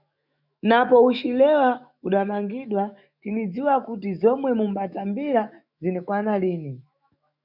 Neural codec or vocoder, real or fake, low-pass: autoencoder, 48 kHz, 128 numbers a frame, DAC-VAE, trained on Japanese speech; fake; 5.4 kHz